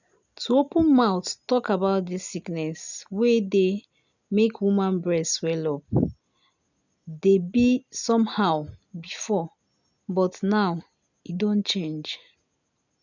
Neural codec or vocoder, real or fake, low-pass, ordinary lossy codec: none; real; 7.2 kHz; none